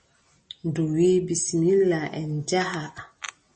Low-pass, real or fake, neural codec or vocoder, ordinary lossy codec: 10.8 kHz; real; none; MP3, 32 kbps